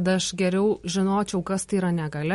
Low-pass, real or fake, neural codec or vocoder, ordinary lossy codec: 19.8 kHz; real; none; MP3, 48 kbps